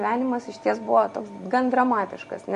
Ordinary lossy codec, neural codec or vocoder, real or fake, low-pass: MP3, 48 kbps; none; real; 14.4 kHz